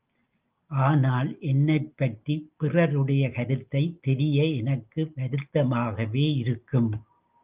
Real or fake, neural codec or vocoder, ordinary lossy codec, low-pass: real; none; Opus, 32 kbps; 3.6 kHz